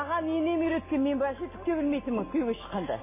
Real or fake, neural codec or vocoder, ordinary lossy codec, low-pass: real; none; MP3, 16 kbps; 3.6 kHz